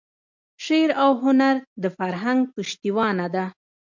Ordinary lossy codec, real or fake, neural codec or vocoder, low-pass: MP3, 64 kbps; real; none; 7.2 kHz